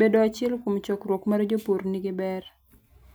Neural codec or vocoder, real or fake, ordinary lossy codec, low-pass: none; real; none; none